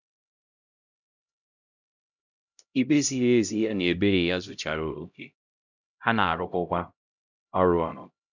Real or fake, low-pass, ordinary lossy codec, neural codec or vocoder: fake; 7.2 kHz; none; codec, 16 kHz, 0.5 kbps, X-Codec, HuBERT features, trained on LibriSpeech